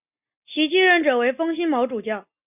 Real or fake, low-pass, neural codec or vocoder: real; 3.6 kHz; none